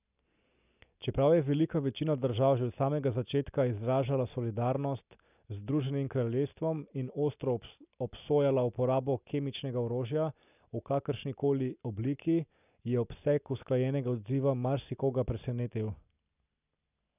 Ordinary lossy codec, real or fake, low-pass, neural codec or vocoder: none; real; 3.6 kHz; none